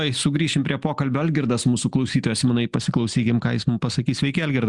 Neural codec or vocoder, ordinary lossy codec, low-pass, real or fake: none; Opus, 64 kbps; 10.8 kHz; real